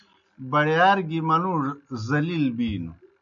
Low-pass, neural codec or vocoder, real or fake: 7.2 kHz; none; real